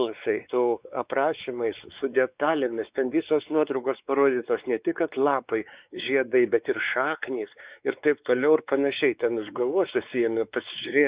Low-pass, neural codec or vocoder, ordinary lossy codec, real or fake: 3.6 kHz; codec, 16 kHz, 4 kbps, X-Codec, WavLM features, trained on Multilingual LibriSpeech; Opus, 64 kbps; fake